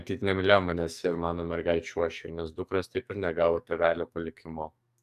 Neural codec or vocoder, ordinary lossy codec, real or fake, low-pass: codec, 44.1 kHz, 2.6 kbps, SNAC; AAC, 96 kbps; fake; 14.4 kHz